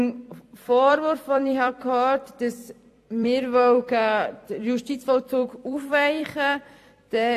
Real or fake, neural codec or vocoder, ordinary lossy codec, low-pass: fake; vocoder, 44.1 kHz, 128 mel bands every 256 samples, BigVGAN v2; AAC, 64 kbps; 14.4 kHz